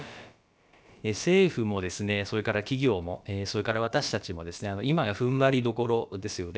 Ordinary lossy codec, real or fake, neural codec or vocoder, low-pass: none; fake; codec, 16 kHz, about 1 kbps, DyCAST, with the encoder's durations; none